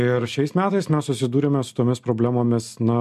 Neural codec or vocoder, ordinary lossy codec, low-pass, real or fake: none; MP3, 64 kbps; 14.4 kHz; real